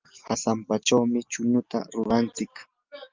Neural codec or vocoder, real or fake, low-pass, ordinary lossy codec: none; real; 7.2 kHz; Opus, 24 kbps